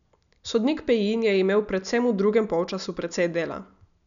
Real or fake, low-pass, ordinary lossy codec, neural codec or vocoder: real; 7.2 kHz; none; none